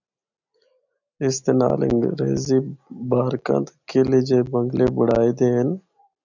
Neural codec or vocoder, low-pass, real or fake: none; 7.2 kHz; real